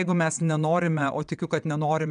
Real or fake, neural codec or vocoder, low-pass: fake; vocoder, 22.05 kHz, 80 mel bands, WaveNeXt; 9.9 kHz